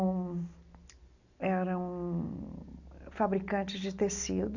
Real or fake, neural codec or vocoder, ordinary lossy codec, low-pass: real; none; none; 7.2 kHz